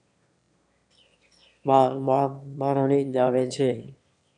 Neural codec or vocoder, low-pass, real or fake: autoencoder, 22.05 kHz, a latent of 192 numbers a frame, VITS, trained on one speaker; 9.9 kHz; fake